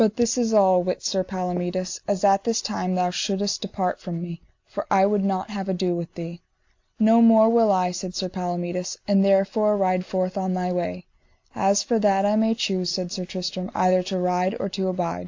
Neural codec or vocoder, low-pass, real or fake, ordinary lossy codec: none; 7.2 kHz; real; AAC, 48 kbps